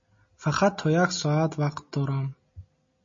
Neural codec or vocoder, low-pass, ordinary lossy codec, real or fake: none; 7.2 kHz; MP3, 32 kbps; real